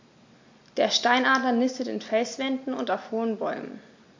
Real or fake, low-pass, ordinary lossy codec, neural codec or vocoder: real; 7.2 kHz; MP3, 48 kbps; none